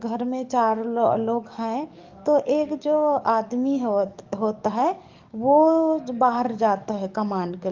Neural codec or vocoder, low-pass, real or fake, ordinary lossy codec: codec, 44.1 kHz, 7.8 kbps, DAC; 7.2 kHz; fake; Opus, 24 kbps